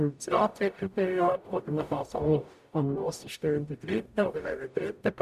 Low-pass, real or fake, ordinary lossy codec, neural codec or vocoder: 14.4 kHz; fake; none; codec, 44.1 kHz, 0.9 kbps, DAC